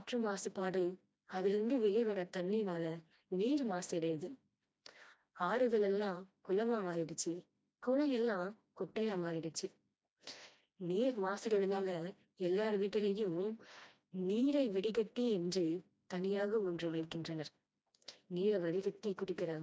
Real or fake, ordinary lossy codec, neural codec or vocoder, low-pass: fake; none; codec, 16 kHz, 1 kbps, FreqCodec, smaller model; none